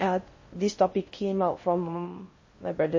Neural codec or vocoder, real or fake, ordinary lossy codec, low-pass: codec, 16 kHz in and 24 kHz out, 0.6 kbps, FocalCodec, streaming, 4096 codes; fake; MP3, 32 kbps; 7.2 kHz